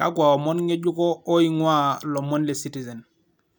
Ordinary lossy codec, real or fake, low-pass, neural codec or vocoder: none; real; 19.8 kHz; none